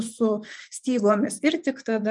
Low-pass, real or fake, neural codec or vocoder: 10.8 kHz; fake; vocoder, 24 kHz, 100 mel bands, Vocos